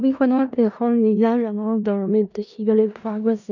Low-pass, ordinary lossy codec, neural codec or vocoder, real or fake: 7.2 kHz; none; codec, 16 kHz in and 24 kHz out, 0.4 kbps, LongCat-Audio-Codec, four codebook decoder; fake